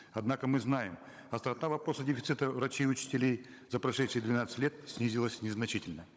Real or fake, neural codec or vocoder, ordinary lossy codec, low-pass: fake; codec, 16 kHz, 16 kbps, FunCodec, trained on Chinese and English, 50 frames a second; none; none